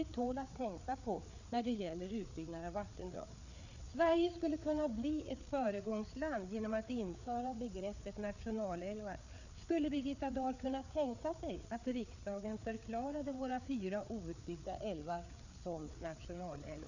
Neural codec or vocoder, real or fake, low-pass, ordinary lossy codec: codec, 16 kHz, 4 kbps, FreqCodec, larger model; fake; 7.2 kHz; none